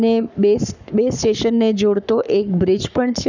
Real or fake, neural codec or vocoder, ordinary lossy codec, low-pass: fake; codec, 44.1 kHz, 7.8 kbps, Pupu-Codec; none; 7.2 kHz